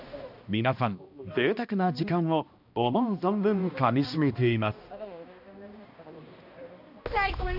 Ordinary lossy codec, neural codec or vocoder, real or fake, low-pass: AAC, 48 kbps; codec, 16 kHz, 1 kbps, X-Codec, HuBERT features, trained on balanced general audio; fake; 5.4 kHz